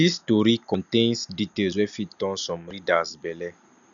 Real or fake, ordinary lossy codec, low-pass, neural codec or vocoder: real; none; 7.2 kHz; none